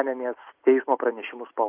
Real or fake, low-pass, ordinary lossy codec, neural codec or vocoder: real; 3.6 kHz; Opus, 24 kbps; none